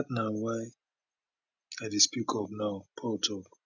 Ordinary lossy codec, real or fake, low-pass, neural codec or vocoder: none; real; 7.2 kHz; none